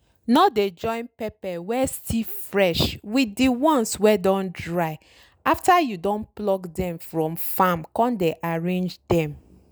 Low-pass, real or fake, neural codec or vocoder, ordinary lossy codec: none; real; none; none